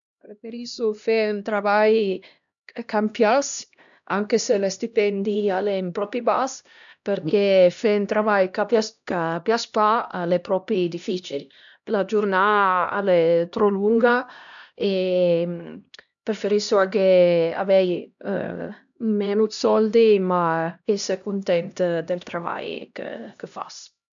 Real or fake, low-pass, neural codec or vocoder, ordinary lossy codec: fake; 7.2 kHz; codec, 16 kHz, 1 kbps, X-Codec, HuBERT features, trained on LibriSpeech; none